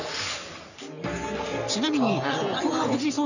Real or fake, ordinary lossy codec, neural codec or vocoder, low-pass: fake; none; codec, 44.1 kHz, 3.4 kbps, Pupu-Codec; 7.2 kHz